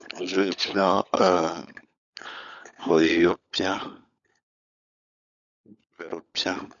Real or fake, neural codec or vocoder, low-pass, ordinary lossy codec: fake; codec, 16 kHz, 16 kbps, FunCodec, trained on LibriTTS, 50 frames a second; 7.2 kHz; none